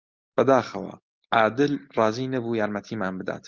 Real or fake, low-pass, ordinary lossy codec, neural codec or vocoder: real; 7.2 kHz; Opus, 24 kbps; none